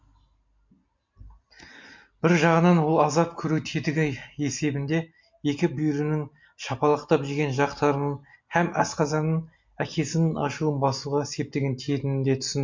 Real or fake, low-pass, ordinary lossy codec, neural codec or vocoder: real; 7.2 kHz; MP3, 48 kbps; none